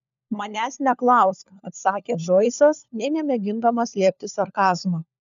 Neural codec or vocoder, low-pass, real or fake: codec, 16 kHz, 4 kbps, FunCodec, trained on LibriTTS, 50 frames a second; 7.2 kHz; fake